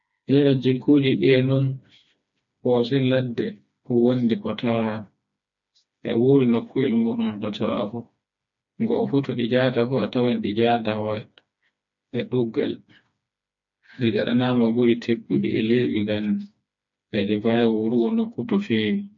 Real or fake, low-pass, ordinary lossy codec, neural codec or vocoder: fake; 7.2 kHz; MP3, 48 kbps; codec, 16 kHz, 2 kbps, FreqCodec, smaller model